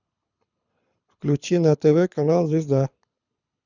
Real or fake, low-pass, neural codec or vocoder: fake; 7.2 kHz; codec, 24 kHz, 6 kbps, HILCodec